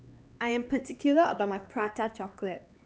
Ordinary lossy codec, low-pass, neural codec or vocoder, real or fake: none; none; codec, 16 kHz, 2 kbps, X-Codec, HuBERT features, trained on LibriSpeech; fake